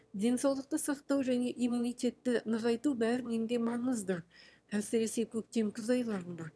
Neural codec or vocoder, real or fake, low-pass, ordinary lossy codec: autoencoder, 22.05 kHz, a latent of 192 numbers a frame, VITS, trained on one speaker; fake; none; none